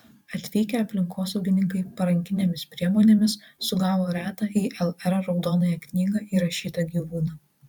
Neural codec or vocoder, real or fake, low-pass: vocoder, 44.1 kHz, 128 mel bands every 512 samples, BigVGAN v2; fake; 19.8 kHz